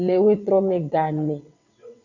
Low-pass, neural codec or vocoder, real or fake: 7.2 kHz; vocoder, 22.05 kHz, 80 mel bands, WaveNeXt; fake